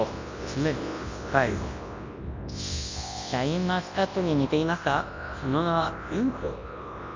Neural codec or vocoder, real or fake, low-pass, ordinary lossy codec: codec, 24 kHz, 0.9 kbps, WavTokenizer, large speech release; fake; 7.2 kHz; MP3, 48 kbps